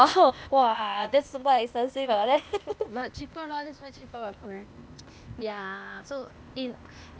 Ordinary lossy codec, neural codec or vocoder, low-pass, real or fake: none; codec, 16 kHz, 0.8 kbps, ZipCodec; none; fake